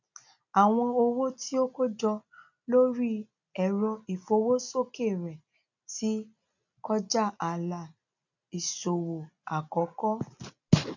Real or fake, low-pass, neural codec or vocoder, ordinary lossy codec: real; 7.2 kHz; none; none